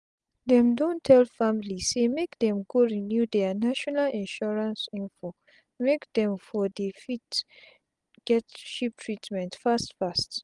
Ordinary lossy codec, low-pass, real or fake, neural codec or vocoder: Opus, 32 kbps; 10.8 kHz; real; none